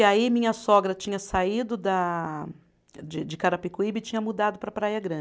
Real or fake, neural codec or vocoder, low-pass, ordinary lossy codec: real; none; none; none